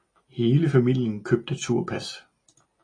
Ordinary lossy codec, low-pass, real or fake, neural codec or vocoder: AAC, 32 kbps; 9.9 kHz; real; none